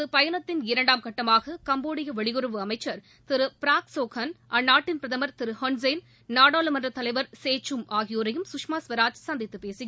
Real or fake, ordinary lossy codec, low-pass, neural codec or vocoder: real; none; none; none